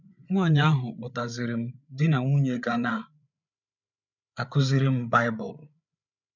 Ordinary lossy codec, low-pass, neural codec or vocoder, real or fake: none; 7.2 kHz; codec, 16 kHz, 8 kbps, FreqCodec, larger model; fake